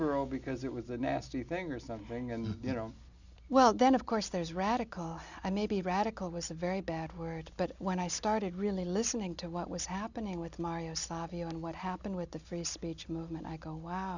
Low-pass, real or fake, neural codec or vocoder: 7.2 kHz; real; none